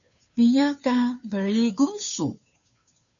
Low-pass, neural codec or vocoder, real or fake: 7.2 kHz; codec, 16 kHz, 2 kbps, FunCodec, trained on Chinese and English, 25 frames a second; fake